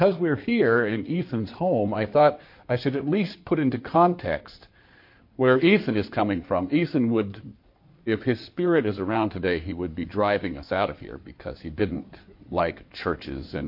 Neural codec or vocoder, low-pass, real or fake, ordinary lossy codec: codec, 16 kHz in and 24 kHz out, 2.2 kbps, FireRedTTS-2 codec; 5.4 kHz; fake; MP3, 32 kbps